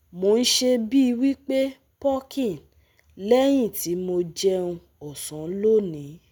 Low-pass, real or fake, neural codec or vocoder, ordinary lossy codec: none; real; none; none